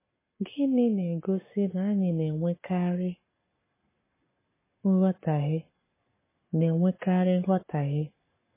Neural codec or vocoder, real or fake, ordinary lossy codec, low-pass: codec, 44.1 kHz, 7.8 kbps, Pupu-Codec; fake; MP3, 16 kbps; 3.6 kHz